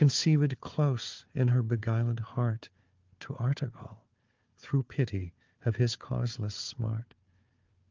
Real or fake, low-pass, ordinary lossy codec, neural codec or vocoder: fake; 7.2 kHz; Opus, 32 kbps; codec, 16 kHz, 4 kbps, FunCodec, trained on Chinese and English, 50 frames a second